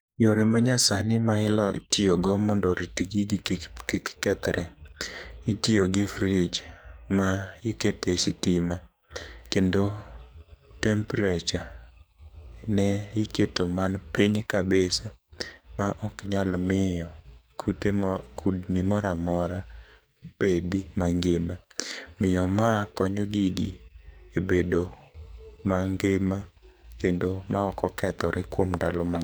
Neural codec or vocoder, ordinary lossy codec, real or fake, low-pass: codec, 44.1 kHz, 2.6 kbps, SNAC; none; fake; none